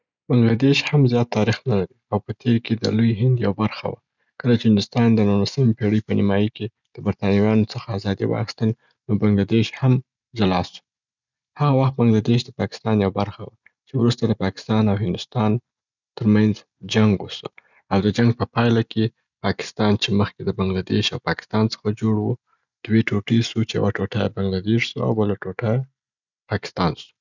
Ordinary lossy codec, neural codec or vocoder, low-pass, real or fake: none; none; 7.2 kHz; real